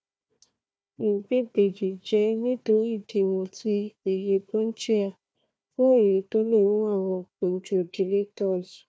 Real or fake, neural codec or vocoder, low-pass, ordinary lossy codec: fake; codec, 16 kHz, 1 kbps, FunCodec, trained on Chinese and English, 50 frames a second; none; none